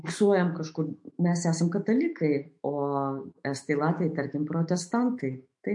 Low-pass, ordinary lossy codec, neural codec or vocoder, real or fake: 10.8 kHz; MP3, 48 kbps; autoencoder, 48 kHz, 128 numbers a frame, DAC-VAE, trained on Japanese speech; fake